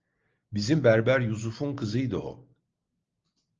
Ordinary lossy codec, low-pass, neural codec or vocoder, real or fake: Opus, 24 kbps; 7.2 kHz; none; real